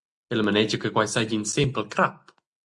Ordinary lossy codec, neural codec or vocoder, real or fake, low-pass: Opus, 64 kbps; none; real; 10.8 kHz